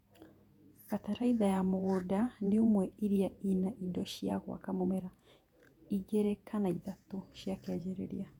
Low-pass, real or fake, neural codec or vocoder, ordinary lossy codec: 19.8 kHz; fake; vocoder, 48 kHz, 128 mel bands, Vocos; none